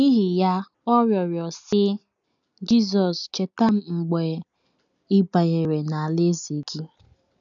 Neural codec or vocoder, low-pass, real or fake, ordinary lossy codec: none; 7.2 kHz; real; none